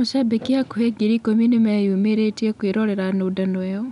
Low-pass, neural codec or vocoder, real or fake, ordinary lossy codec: 10.8 kHz; none; real; none